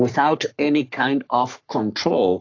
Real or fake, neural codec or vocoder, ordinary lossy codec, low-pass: fake; codec, 44.1 kHz, 3.4 kbps, Pupu-Codec; AAC, 48 kbps; 7.2 kHz